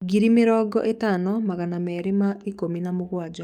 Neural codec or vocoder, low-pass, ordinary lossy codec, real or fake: codec, 44.1 kHz, 7.8 kbps, DAC; 19.8 kHz; none; fake